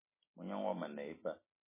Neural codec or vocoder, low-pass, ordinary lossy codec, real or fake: vocoder, 44.1 kHz, 128 mel bands every 256 samples, BigVGAN v2; 3.6 kHz; MP3, 16 kbps; fake